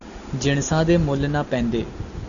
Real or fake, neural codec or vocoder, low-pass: real; none; 7.2 kHz